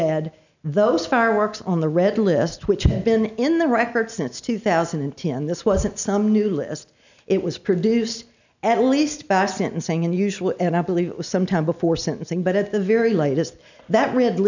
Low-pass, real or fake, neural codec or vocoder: 7.2 kHz; real; none